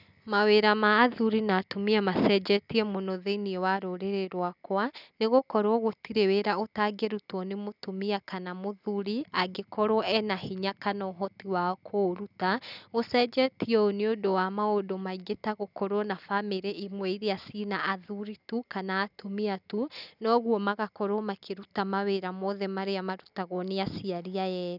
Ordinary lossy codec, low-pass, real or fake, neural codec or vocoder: none; 5.4 kHz; real; none